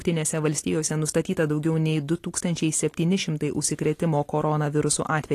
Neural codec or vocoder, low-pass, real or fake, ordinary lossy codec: none; 14.4 kHz; real; AAC, 48 kbps